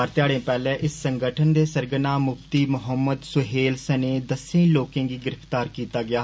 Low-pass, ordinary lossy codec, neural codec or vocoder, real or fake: none; none; none; real